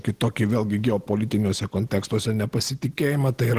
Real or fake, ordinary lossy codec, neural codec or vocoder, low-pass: real; Opus, 16 kbps; none; 14.4 kHz